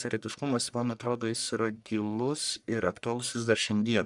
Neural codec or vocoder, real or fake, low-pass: codec, 44.1 kHz, 1.7 kbps, Pupu-Codec; fake; 10.8 kHz